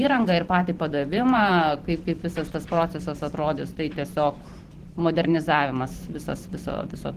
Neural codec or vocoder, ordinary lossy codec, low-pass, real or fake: vocoder, 44.1 kHz, 128 mel bands every 512 samples, BigVGAN v2; Opus, 16 kbps; 14.4 kHz; fake